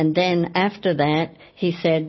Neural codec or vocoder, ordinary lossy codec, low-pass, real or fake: none; MP3, 24 kbps; 7.2 kHz; real